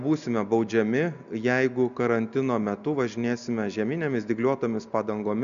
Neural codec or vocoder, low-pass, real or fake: none; 7.2 kHz; real